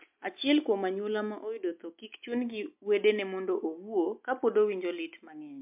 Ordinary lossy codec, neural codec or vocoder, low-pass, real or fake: MP3, 32 kbps; none; 3.6 kHz; real